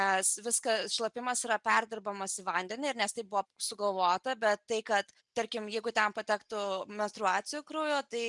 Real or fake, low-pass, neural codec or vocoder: real; 10.8 kHz; none